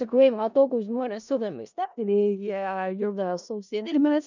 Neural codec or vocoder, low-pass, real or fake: codec, 16 kHz in and 24 kHz out, 0.4 kbps, LongCat-Audio-Codec, four codebook decoder; 7.2 kHz; fake